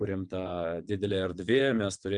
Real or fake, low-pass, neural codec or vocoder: fake; 9.9 kHz; vocoder, 22.05 kHz, 80 mel bands, WaveNeXt